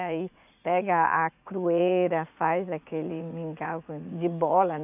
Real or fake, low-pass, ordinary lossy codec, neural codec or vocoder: fake; 3.6 kHz; none; vocoder, 44.1 kHz, 80 mel bands, Vocos